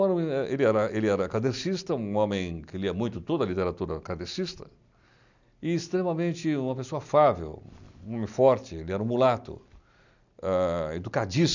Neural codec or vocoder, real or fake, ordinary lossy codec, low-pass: none; real; none; 7.2 kHz